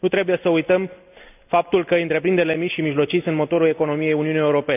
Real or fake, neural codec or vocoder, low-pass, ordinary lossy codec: real; none; 3.6 kHz; none